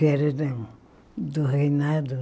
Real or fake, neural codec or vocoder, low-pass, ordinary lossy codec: real; none; none; none